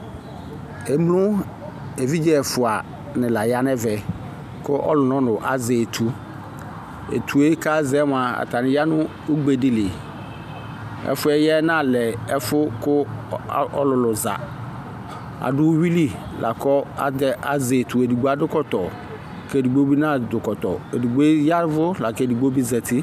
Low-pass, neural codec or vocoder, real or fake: 14.4 kHz; none; real